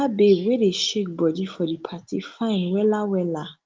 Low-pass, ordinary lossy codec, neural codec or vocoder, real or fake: 7.2 kHz; Opus, 32 kbps; none; real